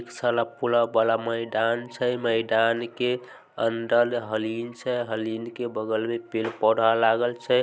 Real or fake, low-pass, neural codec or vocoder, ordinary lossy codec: real; none; none; none